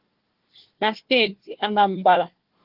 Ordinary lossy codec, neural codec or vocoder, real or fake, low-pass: Opus, 16 kbps; codec, 16 kHz, 1 kbps, FunCodec, trained on Chinese and English, 50 frames a second; fake; 5.4 kHz